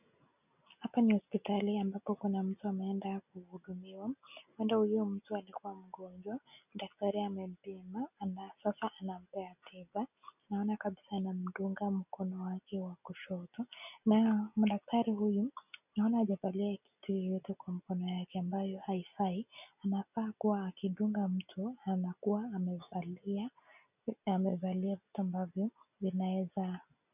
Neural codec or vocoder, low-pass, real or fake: none; 3.6 kHz; real